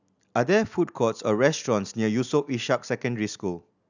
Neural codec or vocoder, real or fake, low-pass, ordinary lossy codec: none; real; 7.2 kHz; none